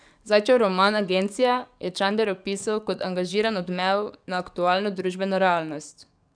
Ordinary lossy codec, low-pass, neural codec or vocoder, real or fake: none; 9.9 kHz; codec, 44.1 kHz, 7.8 kbps, DAC; fake